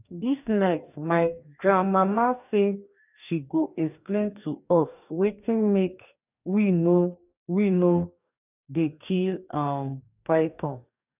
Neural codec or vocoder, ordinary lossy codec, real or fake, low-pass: codec, 44.1 kHz, 2.6 kbps, DAC; none; fake; 3.6 kHz